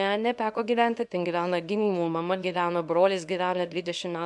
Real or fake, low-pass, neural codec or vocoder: fake; 10.8 kHz; codec, 24 kHz, 0.9 kbps, WavTokenizer, small release